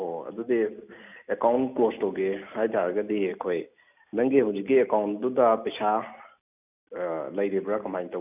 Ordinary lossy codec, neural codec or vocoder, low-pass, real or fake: none; none; 3.6 kHz; real